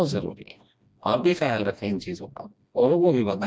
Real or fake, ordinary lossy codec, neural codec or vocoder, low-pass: fake; none; codec, 16 kHz, 1 kbps, FreqCodec, smaller model; none